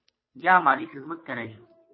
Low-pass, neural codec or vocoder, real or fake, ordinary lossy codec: 7.2 kHz; codec, 16 kHz, 2 kbps, FunCodec, trained on Chinese and English, 25 frames a second; fake; MP3, 24 kbps